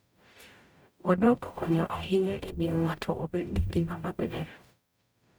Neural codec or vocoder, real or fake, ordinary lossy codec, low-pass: codec, 44.1 kHz, 0.9 kbps, DAC; fake; none; none